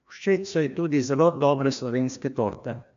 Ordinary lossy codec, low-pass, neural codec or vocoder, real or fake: MP3, 64 kbps; 7.2 kHz; codec, 16 kHz, 1 kbps, FreqCodec, larger model; fake